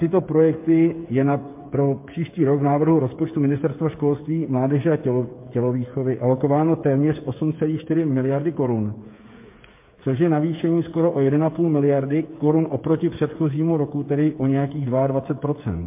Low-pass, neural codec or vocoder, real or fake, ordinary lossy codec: 3.6 kHz; codec, 16 kHz, 8 kbps, FreqCodec, smaller model; fake; MP3, 24 kbps